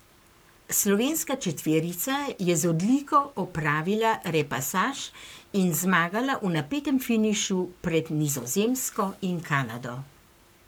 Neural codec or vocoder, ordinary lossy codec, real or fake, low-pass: codec, 44.1 kHz, 7.8 kbps, Pupu-Codec; none; fake; none